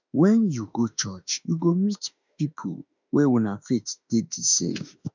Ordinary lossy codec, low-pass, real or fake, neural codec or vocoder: none; 7.2 kHz; fake; autoencoder, 48 kHz, 32 numbers a frame, DAC-VAE, trained on Japanese speech